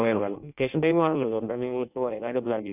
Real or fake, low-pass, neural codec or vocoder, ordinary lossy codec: fake; 3.6 kHz; codec, 16 kHz in and 24 kHz out, 0.6 kbps, FireRedTTS-2 codec; none